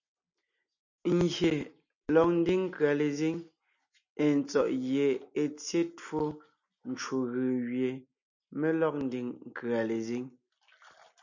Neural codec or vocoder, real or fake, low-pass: none; real; 7.2 kHz